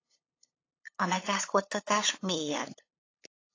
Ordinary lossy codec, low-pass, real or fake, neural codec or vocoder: AAC, 32 kbps; 7.2 kHz; fake; codec, 16 kHz, 8 kbps, FunCodec, trained on LibriTTS, 25 frames a second